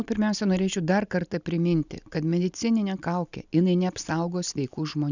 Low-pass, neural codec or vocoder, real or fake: 7.2 kHz; none; real